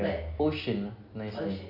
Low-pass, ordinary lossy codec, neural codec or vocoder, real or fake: 5.4 kHz; none; none; real